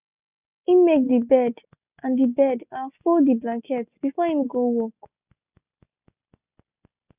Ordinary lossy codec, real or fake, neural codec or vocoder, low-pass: none; real; none; 3.6 kHz